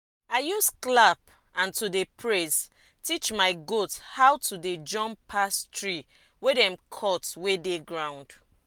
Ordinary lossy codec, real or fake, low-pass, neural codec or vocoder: none; real; none; none